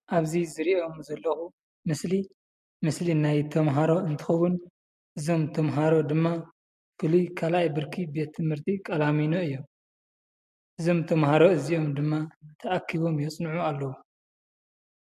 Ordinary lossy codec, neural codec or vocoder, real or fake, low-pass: MP3, 64 kbps; none; real; 14.4 kHz